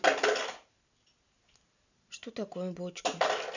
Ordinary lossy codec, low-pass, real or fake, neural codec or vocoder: none; 7.2 kHz; real; none